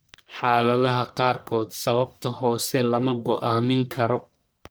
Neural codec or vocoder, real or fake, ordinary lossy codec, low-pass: codec, 44.1 kHz, 1.7 kbps, Pupu-Codec; fake; none; none